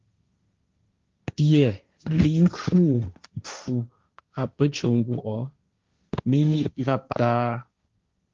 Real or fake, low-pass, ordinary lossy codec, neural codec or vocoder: fake; 7.2 kHz; Opus, 32 kbps; codec, 16 kHz, 1.1 kbps, Voila-Tokenizer